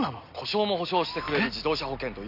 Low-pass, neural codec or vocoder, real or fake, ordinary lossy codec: 5.4 kHz; none; real; none